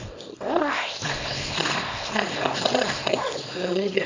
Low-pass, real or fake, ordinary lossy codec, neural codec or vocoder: 7.2 kHz; fake; MP3, 64 kbps; codec, 24 kHz, 0.9 kbps, WavTokenizer, small release